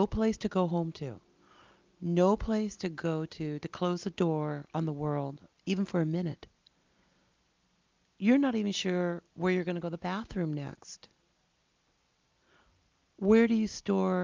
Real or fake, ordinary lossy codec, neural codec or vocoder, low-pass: real; Opus, 24 kbps; none; 7.2 kHz